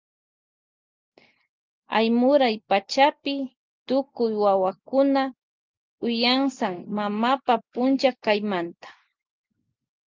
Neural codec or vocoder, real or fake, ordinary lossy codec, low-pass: none; real; Opus, 32 kbps; 7.2 kHz